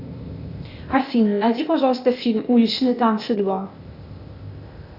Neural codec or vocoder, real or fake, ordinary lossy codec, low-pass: codec, 16 kHz, 0.8 kbps, ZipCodec; fake; Opus, 64 kbps; 5.4 kHz